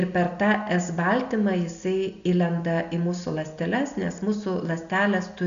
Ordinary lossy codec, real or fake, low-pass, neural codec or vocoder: MP3, 96 kbps; real; 7.2 kHz; none